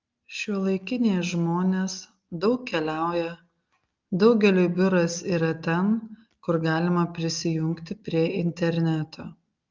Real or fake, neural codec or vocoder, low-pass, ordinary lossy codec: real; none; 7.2 kHz; Opus, 24 kbps